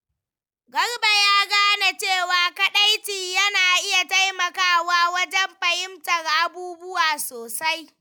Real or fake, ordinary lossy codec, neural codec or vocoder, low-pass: real; none; none; none